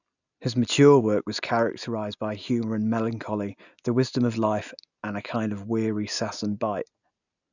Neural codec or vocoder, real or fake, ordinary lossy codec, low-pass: none; real; none; 7.2 kHz